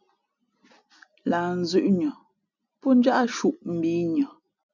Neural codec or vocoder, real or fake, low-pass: none; real; 7.2 kHz